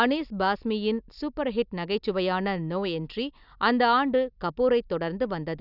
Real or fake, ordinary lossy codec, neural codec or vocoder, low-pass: real; none; none; 5.4 kHz